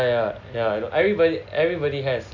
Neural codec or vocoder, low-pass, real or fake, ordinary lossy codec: none; 7.2 kHz; real; none